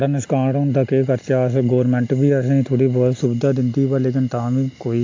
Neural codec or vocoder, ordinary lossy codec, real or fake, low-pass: none; AAC, 48 kbps; real; 7.2 kHz